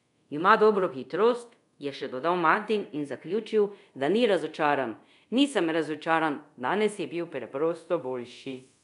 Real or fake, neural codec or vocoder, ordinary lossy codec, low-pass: fake; codec, 24 kHz, 0.5 kbps, DualCodec; none; 10.8 kHz